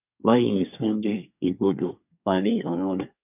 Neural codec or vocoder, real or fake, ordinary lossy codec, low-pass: codec, 24 kHz, 1 kbps, SNAC; fake; none; 3.6 kHz